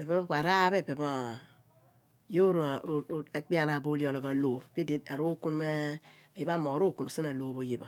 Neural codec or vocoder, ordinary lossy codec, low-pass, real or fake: codec, 44.1 kHz, 7.8 kbps, DAC; none; 19.8 kHz; fake